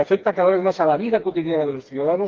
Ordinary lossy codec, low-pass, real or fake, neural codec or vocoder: Opus, 32 kbps; 7.2 kHz; fake; codec, 16 kHz, 2 kbps, FreqCodec, smaller model